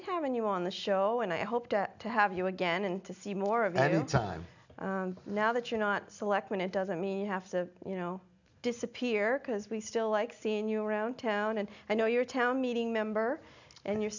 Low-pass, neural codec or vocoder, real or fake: 7.2 kHz; none; real